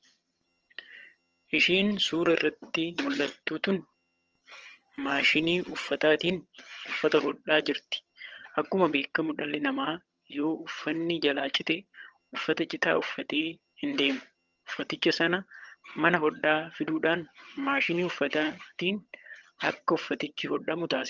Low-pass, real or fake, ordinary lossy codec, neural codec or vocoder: 7.2 kHz; fake; Opus, 24 kbps; vocoder, 22.05 kHz, 80 mel bands, HiFi-GAN